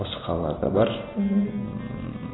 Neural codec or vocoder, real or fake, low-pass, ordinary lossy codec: none; real; 7.2 kHz; AAC, 16 kbps